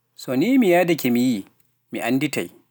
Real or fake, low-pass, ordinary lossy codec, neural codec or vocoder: real; none; none; none